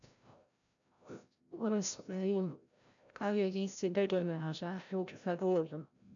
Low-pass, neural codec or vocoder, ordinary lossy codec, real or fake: 7.2 kHz; codec, 16 kHz, 0.5 kbps, FreqCodec, larger model; none; fake